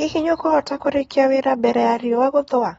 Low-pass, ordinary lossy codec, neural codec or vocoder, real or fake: 19.8 kHz; AAC, 24 kbps; none; real